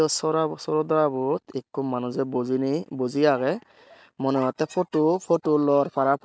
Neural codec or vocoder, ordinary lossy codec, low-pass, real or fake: none; none; none; real